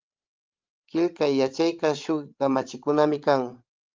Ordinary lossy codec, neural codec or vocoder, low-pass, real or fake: Opus, 32 kbps; codec, 16 kHz, 16 kbps, FreqCodec, larger model; 7.2 kHz; fake